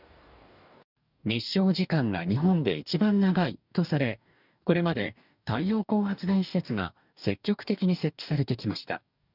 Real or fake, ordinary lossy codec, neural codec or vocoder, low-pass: fake; none; codec, 44.1 kHz, 2.6 kbps, DAC; 5.4 kHz